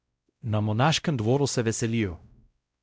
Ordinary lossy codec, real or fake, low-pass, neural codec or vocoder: none; fake; none; codec, 16 kHz, 0.5 kbps, X-Codec, WavLM features, trained on Multilingual LibriSpeech